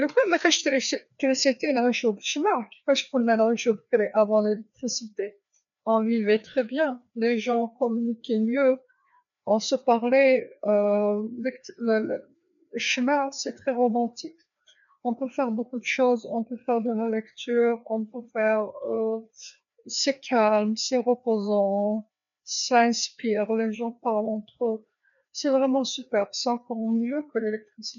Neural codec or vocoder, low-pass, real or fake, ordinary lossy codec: codec, 16 kHz, 2 kbps, FreqCodec, larger model; 7.2 kHz; fake; none